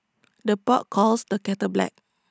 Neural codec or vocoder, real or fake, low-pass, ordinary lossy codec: none; real; none; none